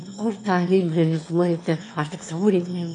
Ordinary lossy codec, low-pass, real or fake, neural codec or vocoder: AAC, 48 kbps; 9.9 kHz; fake; autoencoder, 22.05 kHz, a latent of 192 numbers a frame, VITS, trained on one speaker